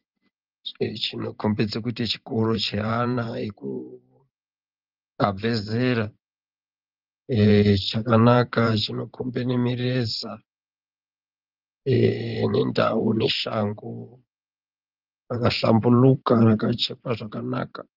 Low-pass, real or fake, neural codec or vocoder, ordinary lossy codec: 5.4 kHz; fake; vocoder, 44.1 kHz, 128 mel bands every 512 samples, BigVGAN v2; Opus, 24 kbps